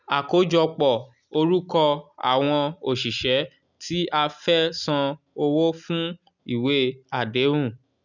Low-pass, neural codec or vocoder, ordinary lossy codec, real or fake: 7.2 kHz; none; none; real